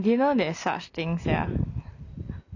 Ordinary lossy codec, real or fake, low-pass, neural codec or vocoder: none; real; 7.2 kHz; none